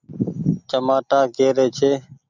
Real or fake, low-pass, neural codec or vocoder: real; 7.2 kHz; none